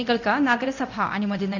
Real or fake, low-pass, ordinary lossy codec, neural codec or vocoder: fake; 7.2 kHz; none; codec, 24 kHz, 0.9 kbps, DualCodec